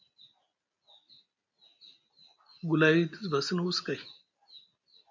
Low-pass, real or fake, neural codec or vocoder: 7.2 kHz; real; none